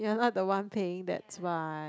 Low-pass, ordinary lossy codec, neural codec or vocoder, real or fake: none; none; none; real